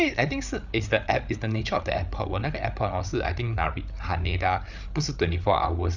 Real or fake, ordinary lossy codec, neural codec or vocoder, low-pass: fake; none; codec, 16 kHz, 8 kbps, FreqCodec, larger model; 7.2 kHz